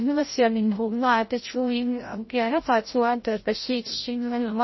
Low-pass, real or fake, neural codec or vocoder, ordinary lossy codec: 7.2 kHz; fake; codec, 16 kHz, 0.5 kbps, FreqCodec, larger model; MP3, 24 kbps